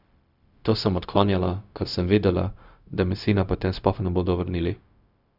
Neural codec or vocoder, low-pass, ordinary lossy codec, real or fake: codec, 16 kHz, 0.4 kbps, LongCat-Audio-Codec; 5.4 kHz; none; fake